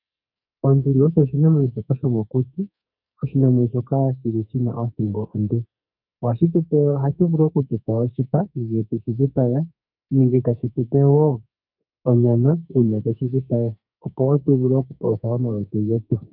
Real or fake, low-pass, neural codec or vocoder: fake; 5.4 kHz; codec, 44.1 kHz, 2.6 kbps, SNAC